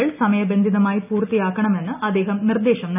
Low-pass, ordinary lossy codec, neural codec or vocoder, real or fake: 3.6 kHz; none; none; real